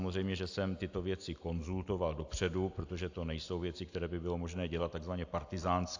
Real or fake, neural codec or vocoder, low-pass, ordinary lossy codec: real; none; 7.2 kHz; AAC, 48 kbps